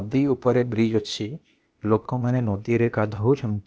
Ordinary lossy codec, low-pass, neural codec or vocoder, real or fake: none; none; codec, 16 kHz, 0.8 kbps, ZipCodec; fake